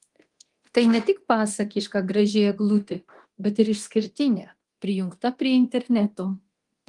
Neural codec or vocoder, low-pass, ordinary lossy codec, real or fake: codec, 24 kHz, 0.9 kbps, DualCodec; 10.8 kHz; Opus, 24 kbps; fake